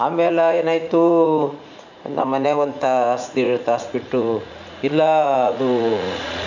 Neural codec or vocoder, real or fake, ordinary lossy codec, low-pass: vocoder, 44.1 kHz, 80 mel bands, Vocos; fake; none; 7.2 kHz